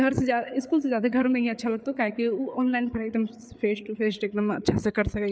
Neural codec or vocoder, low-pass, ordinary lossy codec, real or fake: codec, 16 kHz, 8 kbps, FreqCodec, larger model; none; none; fake